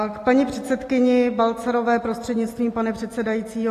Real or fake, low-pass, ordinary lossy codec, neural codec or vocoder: real; 14.4 kHz; AAC, 48 kbps; none